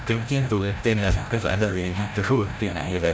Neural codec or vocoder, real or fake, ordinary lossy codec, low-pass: codec, 16 kHz, 0.5 kbps, FreqCodec, larger model; fake; none; none